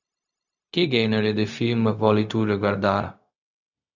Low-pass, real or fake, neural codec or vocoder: 7.2 kHz; fake; codec, 16 kHz, 0.4 kbps, LongCat-Audio-Codec